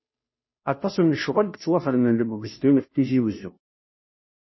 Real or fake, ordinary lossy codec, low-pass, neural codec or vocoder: fake; MP3, 24 kbps; 7.2 kHz; codec, 16 kHz, 0.5 kbps, FunCodec, trained on Chinese and English, 25 frames a second